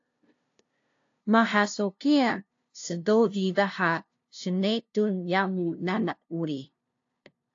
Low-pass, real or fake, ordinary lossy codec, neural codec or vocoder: 7.2 kHz; fake; AAC, 48 kbps; codec, 16 kHz, 0.5 kbps, FunCodec, trained on LibriTTS, 25 frames a second